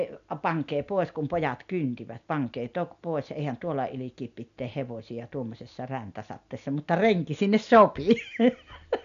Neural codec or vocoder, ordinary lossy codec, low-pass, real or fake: none; MP3, 96 kbps; 7.2 kHz; real